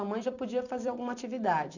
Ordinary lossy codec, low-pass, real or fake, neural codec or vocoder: none; 7.2 kHz; fake; vocoder, 44.1 kHz, 128 mel bands every 256 samples, BigVGAN v2